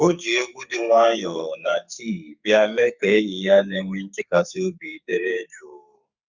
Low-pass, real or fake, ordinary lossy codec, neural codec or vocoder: 7.2 kHz; fake; Opus, 64 kbps; codec, 44.1 kHz, 2.6 kbps, SNAC